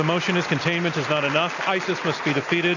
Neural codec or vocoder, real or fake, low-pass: none; real; 7.2 kHz